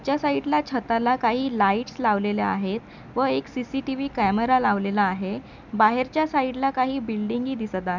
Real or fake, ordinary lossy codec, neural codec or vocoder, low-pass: real; none; none; 7.2 kHz